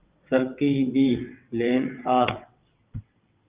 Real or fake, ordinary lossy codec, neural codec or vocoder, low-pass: fake; Opus, 24 kbps; vocoder, 22.05 kHz, 80 mel bands, WaveNeXt; 3.6 kHz